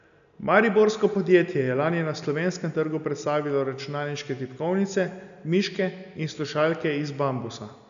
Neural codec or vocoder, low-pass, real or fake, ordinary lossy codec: none; 7.2 kHz; real; none